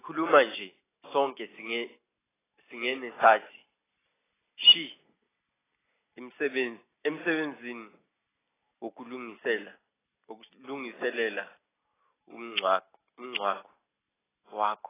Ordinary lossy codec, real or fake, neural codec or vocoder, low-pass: AAC, 16 kbps; real; none; 3.6 kHz